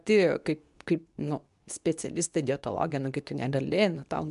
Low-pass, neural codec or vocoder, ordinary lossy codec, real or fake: 10.8 kHz; codec, 24 kHz, 0.9 kbps, WavTokenizer, medium speech release version 2; MP3, 96 kbps; fake